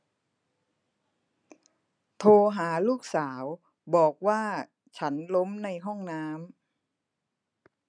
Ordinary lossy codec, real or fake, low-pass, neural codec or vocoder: none; real; 9.9 kHz; none